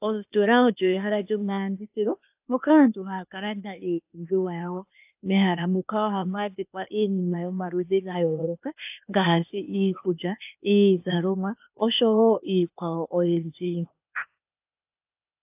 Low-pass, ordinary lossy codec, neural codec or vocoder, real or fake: 3.6 kHz; AAC, 32 kbps; codec, 16 kHz, 0.8 kbps, ZipCodec; fake